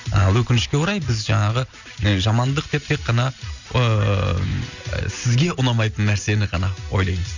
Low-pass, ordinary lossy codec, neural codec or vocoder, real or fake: 7.2 kHz; none; none; real